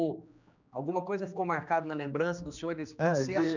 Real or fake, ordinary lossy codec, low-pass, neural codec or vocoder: fake; none; 7.2 kHz; codec, 16 kHz, 2 kbps, X-Codec, HuBERT features, trained on general audio